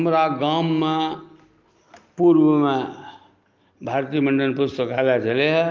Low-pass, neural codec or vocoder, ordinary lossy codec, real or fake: 7.2 kHz; none; Opus, 32 kbps; real